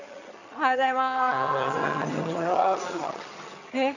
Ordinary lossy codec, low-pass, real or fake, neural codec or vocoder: none; 7.2 kHz; fake; vocoder, 22.05 kHz, 80 mel bands, HiFi-GAN